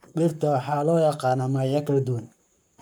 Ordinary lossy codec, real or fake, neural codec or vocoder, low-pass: none; fake; codec, 44.1 kHz, 7.8 kbps, Pupu-Codec; none